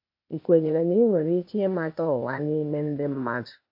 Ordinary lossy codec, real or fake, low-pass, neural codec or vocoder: MP3, 48 kbps; fake; 5.4 kHz; codec, 16 kHz, 0.8 kbps, ZipCodec